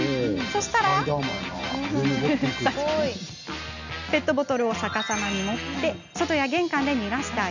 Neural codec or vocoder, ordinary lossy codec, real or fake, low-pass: none; none; real; 7.2 kHz